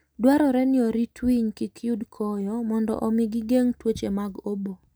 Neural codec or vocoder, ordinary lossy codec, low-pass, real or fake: none; none; none; real